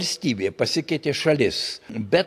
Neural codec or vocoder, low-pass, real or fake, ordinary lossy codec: none; 14.4 kHz; real; AAC, 64 kbps